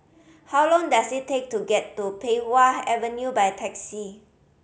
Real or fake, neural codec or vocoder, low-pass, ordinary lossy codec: real; none; none; none